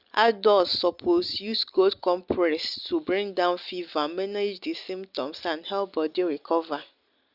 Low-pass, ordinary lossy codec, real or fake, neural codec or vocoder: 5.4 kHz; Opus, 64 kbps; real; none